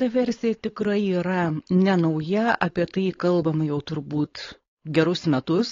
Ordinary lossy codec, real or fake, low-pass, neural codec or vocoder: AAC, 32 kbps; fake; 7.2 kHz; codec, 16 kHz, 4.8 kbps, FACodec